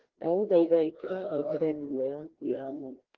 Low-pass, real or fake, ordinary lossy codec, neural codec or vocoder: 7.2 kHz; fake; Opus, 16 kbps; codec, 16 kHz, 1 kbps, FreqCodec, larger model